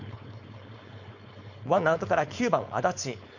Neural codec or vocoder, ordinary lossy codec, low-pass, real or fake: codec, 16 kHz, 4.8 kbps, FACodec; none; 7.2 kHz; fake